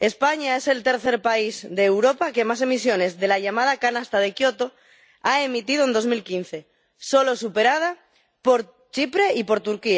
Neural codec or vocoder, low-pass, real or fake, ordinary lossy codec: none; none; real; none